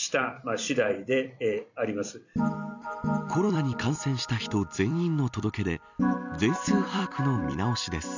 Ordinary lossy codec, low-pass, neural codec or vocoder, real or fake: none; 7.2 kHz; vocoder, 44.1 kHz, 128 mel bands every 256 samples, BigVGAN v2; fake